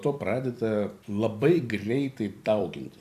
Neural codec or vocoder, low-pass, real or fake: vocoder, 44.1 kHz, 128 mel bands every 256 samples, BigVGAN v2; 14.4 kHz; fake